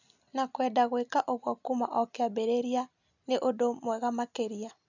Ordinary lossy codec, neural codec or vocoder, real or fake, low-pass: none; none; real; 7.2 kHz